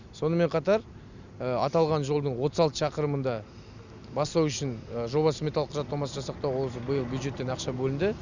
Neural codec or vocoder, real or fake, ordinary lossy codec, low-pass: none; real; none; 7.2 kHz